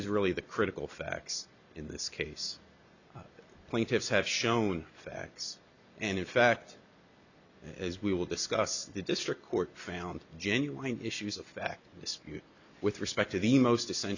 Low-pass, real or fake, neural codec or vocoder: 7.2 kHz; real; none